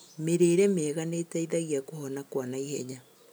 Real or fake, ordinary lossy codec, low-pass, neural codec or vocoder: real; none; none; none